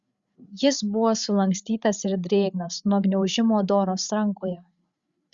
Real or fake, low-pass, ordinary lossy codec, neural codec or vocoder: fake; 7.2 kHz; Opus, 64 kbps; codec, 16 kHz, 8 kbps, FreqCodec, larger model